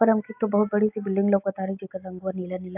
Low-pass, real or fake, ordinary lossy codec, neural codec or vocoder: 3.6 kHz; real; AAC, 32 kbps; none